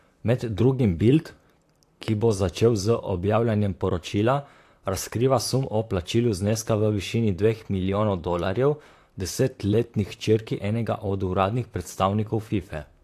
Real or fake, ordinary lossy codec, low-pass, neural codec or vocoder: fake; AAC, 64 kbps; 14.4 kHz; vocoder, 44.1 kHz, 128 mel bands, Pupu-Vocoder